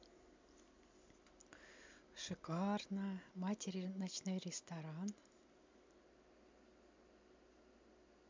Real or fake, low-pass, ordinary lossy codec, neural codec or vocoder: real; 7.2 kHz; MP3, 64 kbps; none